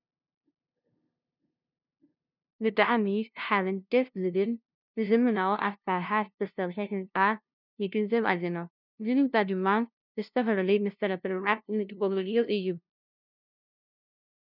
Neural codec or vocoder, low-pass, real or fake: codec, 16 kHz, 0.5 kbps, FunCodec, trained on LibriTTS, 25 frames a second; 5.4 kHz; fake